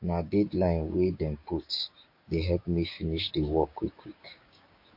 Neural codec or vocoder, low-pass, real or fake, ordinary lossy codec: codec, 16 kHz, 6 kbps, DAC; 5.4 kHz; fake; MP3, 32 kbps